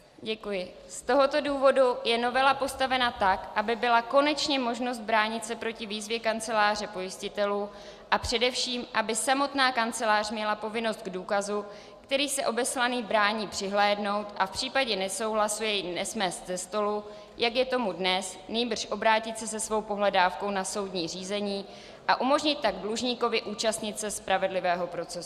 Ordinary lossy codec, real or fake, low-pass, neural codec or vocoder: Opus, 64 kbps; real; 14.4 kHz; none